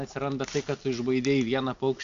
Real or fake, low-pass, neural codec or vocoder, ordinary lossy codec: real; 7.2 kHz; none; MP3, 64 kbps